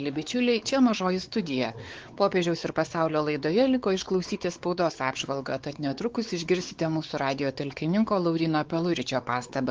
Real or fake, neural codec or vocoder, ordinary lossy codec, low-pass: fake; codec, 16 kHz, 4 kbps, X-Codec, WavLM features, trained on Multilingual LibriSpeech; Opus, 16 kbps; 7.2 kHz